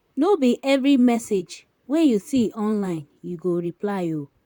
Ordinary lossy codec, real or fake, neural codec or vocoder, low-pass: none; fake; vocoder, 44.1 kHz, 128 mel bands, Pupu-Vocoder; 19.8 kHz